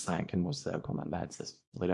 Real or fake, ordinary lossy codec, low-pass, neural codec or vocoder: fake; MP3, 64 kbps; 10.8 kHz; codec, 24 kHz, 0.9 kbps, WavTokenizer, small release